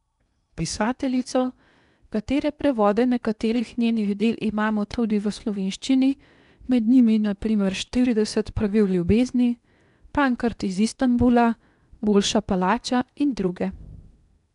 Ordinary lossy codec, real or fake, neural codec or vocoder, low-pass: none; fake; codec, 16 kHz in and 24 kHz out, 0.8 kbps, FocalCodec, streaming, 65536 codes; 10.8 kHz